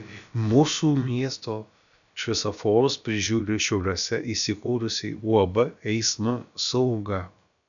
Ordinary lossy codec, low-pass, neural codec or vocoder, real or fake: MP3, 96 kbps; 7.2 kHz; codec, 16 kHz, about 1 kbps, DyCAST, with the encoder's durations; fake